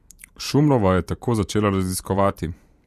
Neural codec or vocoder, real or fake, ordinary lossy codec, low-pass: vocoder, 44.1 kHz, 128 mel bands every 512 samples, BigVGAN v2; fake; MP3, 64 kbps; 14.4 kHz